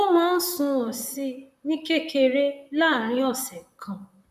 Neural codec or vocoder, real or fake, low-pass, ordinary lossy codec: vocoder, 44.1 kHz, 128 mel bands, Pupu-Vocoder; fake; 14.4 kHz; none